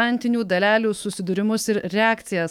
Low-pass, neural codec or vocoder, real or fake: 19.8 kHz; autoencoder, 48 kHz, 128 numbers a frame, DAC-VAE, trained on Japanese speech; fake